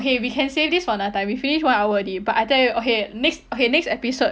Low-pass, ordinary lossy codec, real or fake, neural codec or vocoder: none; none; real; none